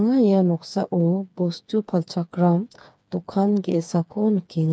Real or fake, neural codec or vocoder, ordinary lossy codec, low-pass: fake; codec, 16 kHz, 4 kbps, FreqCodec, smaller model; none; none